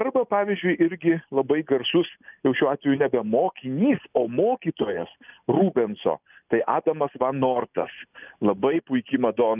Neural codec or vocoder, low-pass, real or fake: none; 3.6 kHz; real